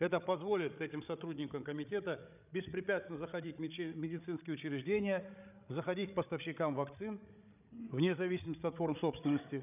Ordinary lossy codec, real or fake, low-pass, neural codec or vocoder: none; fake; 3.6 kHz; codec, 16 kHz, 16 kbps, FreqCodec, larger model